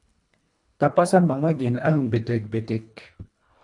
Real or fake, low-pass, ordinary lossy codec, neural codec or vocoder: fake; 10.8 kHz; AAC, 64 kbps; codec, 24 kHz, 1.5 kbps, HILCodec